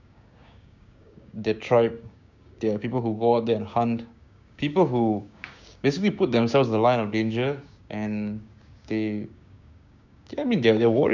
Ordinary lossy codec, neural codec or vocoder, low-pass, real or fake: none; codec, 16 kHz, 6 kbps, DAC; 7.2 kHz; fake